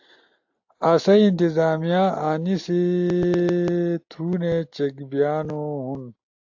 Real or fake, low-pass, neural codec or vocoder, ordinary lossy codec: real; 7.2 kHz; none; AAC, 48 kbps